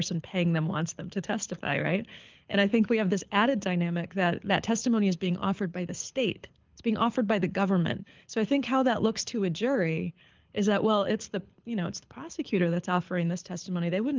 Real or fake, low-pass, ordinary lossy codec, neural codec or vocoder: fake; 7.2 kHz; Opus, 32 kbps; codec, 24 kHz, 6 kbps, HILCodec